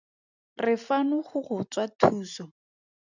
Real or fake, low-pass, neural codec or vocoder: real; 7.2 kHz; none